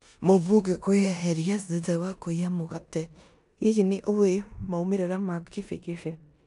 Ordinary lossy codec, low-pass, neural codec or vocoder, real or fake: none; 10.8 kHz; codec, 16 kHz in and 24 kHz out, 0.9 kbps, LongCat-Audio-Codec, four codebook decoder; fake